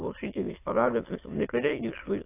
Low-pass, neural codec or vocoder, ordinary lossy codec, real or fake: 3.6 kHz; autoencoder, 22.05 kHz, a latent of 192 numbers a frame, VITS, trained on many speakers; AAC, 24 kbps; fake